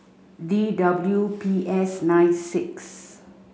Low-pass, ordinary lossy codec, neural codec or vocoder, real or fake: none; none; none; real